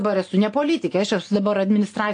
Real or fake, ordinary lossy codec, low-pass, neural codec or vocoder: real; AAC, 48 kbps; 9.9 kHz; none